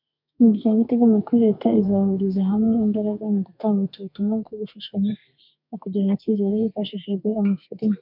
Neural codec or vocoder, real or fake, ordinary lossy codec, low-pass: codec, 32 kHz, 1.9 kbps, SNAC; fake; MP3, 48 kbps; 5.4 kHz